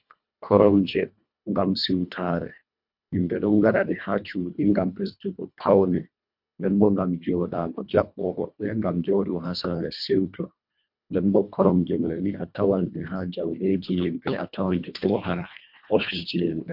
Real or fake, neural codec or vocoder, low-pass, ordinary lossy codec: fake; codec, 24 kHz, 1.5 kbps, HILCodec; 5.4 kHz; MP3, 48 kbps